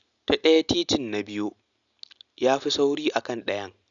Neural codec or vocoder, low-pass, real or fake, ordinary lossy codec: none; 7.2 kHz; real; none